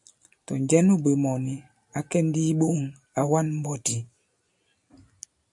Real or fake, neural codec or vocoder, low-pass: real; none; 10.8 kHz